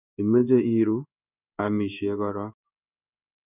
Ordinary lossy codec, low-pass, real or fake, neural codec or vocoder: none; 3.6 kHz; fake; codec, 16 kHz in and 24 kHz out, 1 kbps, XY-Tokenizer